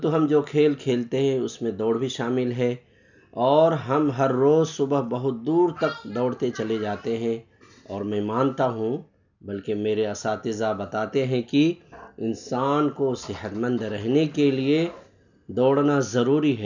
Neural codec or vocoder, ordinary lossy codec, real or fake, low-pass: none; none; real; 7.2 kHz